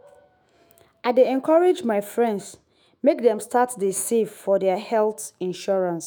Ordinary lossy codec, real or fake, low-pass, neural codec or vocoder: none; fake; none; autoencoder, 48 kHz, 128 numbers a frame, DAC-VAE, trained on Japanese speech